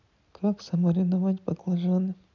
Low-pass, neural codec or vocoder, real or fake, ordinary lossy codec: 7.2 kHz; none; real; none